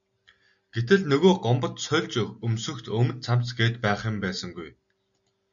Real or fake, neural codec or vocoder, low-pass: real; none; 7.2 kHz